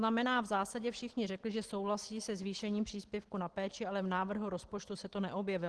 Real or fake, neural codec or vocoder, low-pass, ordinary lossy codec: real; none; 10.8 kHz; Opus, 16 kbps